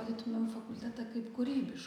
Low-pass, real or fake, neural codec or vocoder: 19.8 kHz; real; none